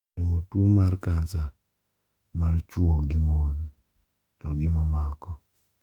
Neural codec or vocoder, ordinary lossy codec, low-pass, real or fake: autoencoder, 48 kHz, 32 numbers a frame, DAC-VAE, trained on Japanese speech; Opus, 64 kbps; 19.8 kHz; fake